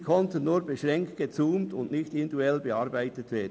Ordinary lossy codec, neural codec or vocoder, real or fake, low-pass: none; none; real; none